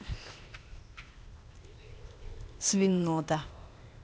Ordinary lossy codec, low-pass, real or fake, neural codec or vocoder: none; none; fake; codec, 16 kHz, 0.8 kbps, ZipCodec